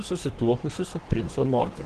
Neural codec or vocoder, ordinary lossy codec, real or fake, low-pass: codec, 24 kHz, 1 kbps, SNAC; Opus, 16 kbps; fake; 10.8 kHz